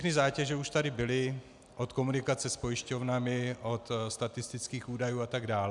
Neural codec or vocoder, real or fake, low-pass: none; real; 10.8 kHz